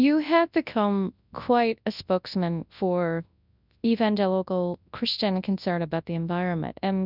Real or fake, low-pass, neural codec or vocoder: fake; 5.4 kHz; codec, 24 kHz, 0.9 kbps, WavTokenizer, large speech release